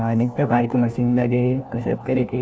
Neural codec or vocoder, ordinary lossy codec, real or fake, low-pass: codec, 16 kHz, 1 kbps, FunCodec, trained on LibriTTS, 50 frames a second; none; fake; none